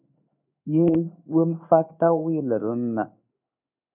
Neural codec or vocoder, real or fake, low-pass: codec, 16 kHz in and 24 kHz out, 1 kbps, XY-Tokenizer; fake; 3.6 kHz